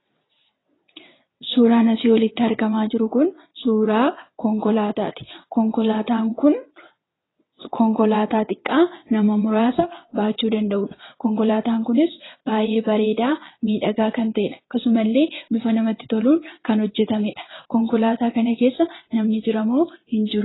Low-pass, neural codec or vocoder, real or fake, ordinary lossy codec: 7.2 kHz; vocoder, 22.05 kHz, 80 mel bands, WaveNeXt; fake; AAC, 16 kbps